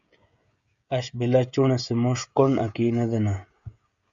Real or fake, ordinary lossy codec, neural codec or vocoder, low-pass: fake; Opus, 64 kbps; codec, 16 kHz, 16 kbps, FreqCodec, smaller model; 7.2 kHz